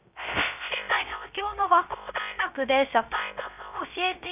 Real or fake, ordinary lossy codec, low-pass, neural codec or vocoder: fake; none; 3.6 kHz; codec, 16 kHz, 0.3 kbps, FocalCodec